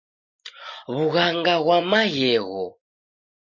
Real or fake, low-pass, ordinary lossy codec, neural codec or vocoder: real; 7.2 kHz; MP3, 32 kbps; none